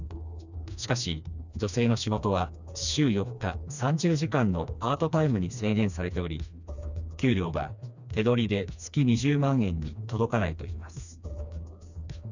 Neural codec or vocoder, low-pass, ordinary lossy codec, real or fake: codec, 16 kHz, 2 kbps, FreqCodec, smaller model; 7.2 kHz; none; fake